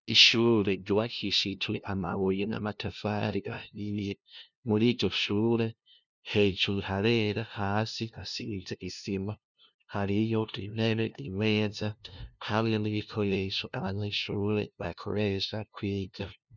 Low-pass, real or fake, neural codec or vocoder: 7.2 kHz; fake; codec, 16 kHz, 0.5 kbps, FunCodec, trained on LibriTTS, 25 frames a second